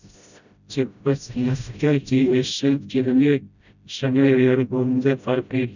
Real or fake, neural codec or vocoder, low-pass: fake; codec, 16 kHz, 0.5 kbps, FreqCodec, smaller model; 7.2 kHz